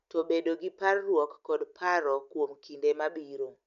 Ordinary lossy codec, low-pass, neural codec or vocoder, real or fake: none; 7.2 kHz; none; real